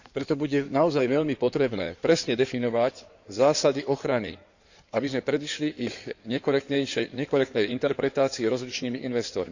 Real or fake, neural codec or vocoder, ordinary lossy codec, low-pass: fake; codec, 16 kHz in and 24 kHz out, 2.2 kbps, FireRedTTS-2 codec; none; 7.2 kHz